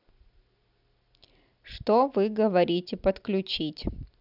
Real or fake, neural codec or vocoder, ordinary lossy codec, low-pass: real; none; none; 5.4 kHz